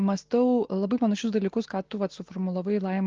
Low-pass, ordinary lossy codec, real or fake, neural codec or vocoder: 7.2 kHz; Opus, 16 kbps; real; none